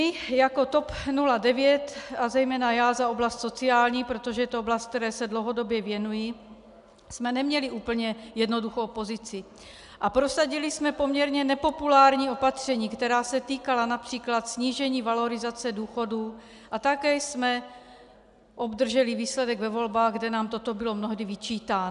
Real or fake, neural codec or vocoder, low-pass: real; none; 10.8 kHz